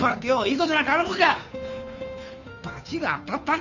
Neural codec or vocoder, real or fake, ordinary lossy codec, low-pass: codec, 16 kHz, 2 kbps, FunCodec, trained on Chinese and English, 25 frames a second; fake; none; 7.2 kHz